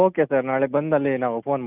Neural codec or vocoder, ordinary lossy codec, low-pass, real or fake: none; none; 3.6 kHz; real